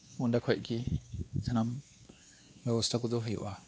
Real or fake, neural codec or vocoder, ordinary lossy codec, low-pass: fake; codec, 16 kHz, 2 kbps, X-Codec, WavLM features, trained on Multilingual LibriSpeech; none; none